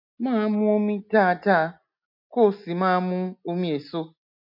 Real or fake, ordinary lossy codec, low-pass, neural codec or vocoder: real; none; 5.4 kHz; none